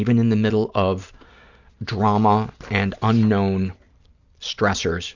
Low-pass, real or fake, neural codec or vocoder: 7.2 kHz; real; none